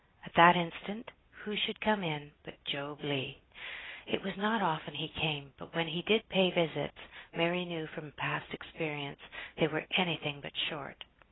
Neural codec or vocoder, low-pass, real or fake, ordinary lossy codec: none; 7.2 kHz; real; AAC, 16 kbps